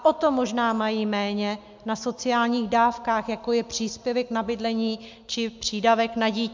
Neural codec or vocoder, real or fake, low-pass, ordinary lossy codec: none; real; 7.2 kHz; MP3, 64 kbps